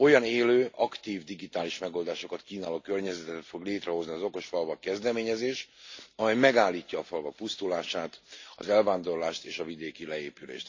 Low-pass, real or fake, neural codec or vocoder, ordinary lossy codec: 7.2 kHz; real; none; AAC, 48 kbps